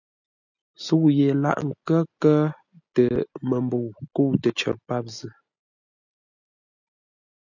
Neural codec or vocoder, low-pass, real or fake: none; 7.2 kHz; real